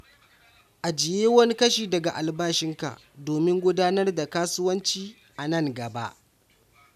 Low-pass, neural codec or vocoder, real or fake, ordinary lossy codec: 14.4 kHz; none; real; none